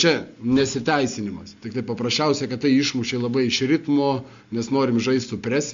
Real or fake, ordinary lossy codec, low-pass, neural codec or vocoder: real; MP3, 48 kbps; 7.2 kHz; none